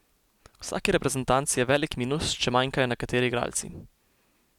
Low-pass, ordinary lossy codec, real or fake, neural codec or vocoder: 19.8 kHz; none; real; none